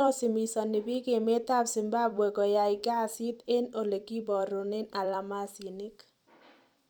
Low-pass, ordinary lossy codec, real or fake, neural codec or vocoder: none; none; fake; vocoder, 44.1 kHz, 128 mel bands every 256 samples, BigVGAN v2